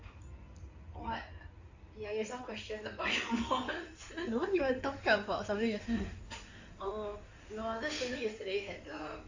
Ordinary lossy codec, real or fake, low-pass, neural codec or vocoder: AAC, 48 kbps; fake; 7.2 kHz; codec, 16 kHz in and 24 kHz out, 2.2 kbps, FireRedTTS-2 codec